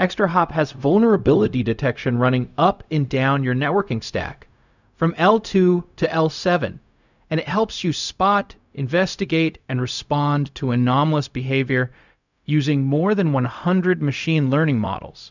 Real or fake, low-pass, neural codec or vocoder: fake; 7.2 kHz; codec, 16 kHz, 0.4 kbps, LongCat-Audio-Codec